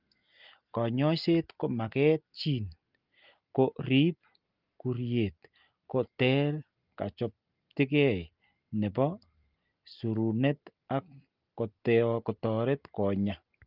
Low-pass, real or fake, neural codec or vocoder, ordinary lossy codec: 5.4 kHz; real; none; Opus, 32 kbps